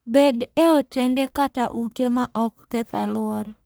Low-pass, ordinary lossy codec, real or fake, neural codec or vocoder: none; none; fake; codec, 44.1 kHz, 1.7 kbps, Pupu-Codec